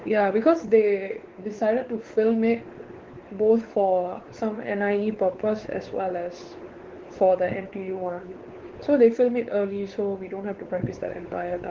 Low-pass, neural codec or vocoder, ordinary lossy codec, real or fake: 7.2 kHz; codec, 16 kHz, 4 kbps, X-Codec, WavLM features, trained on Multilingual LibriSpeech; Opus, 16 kbps; fake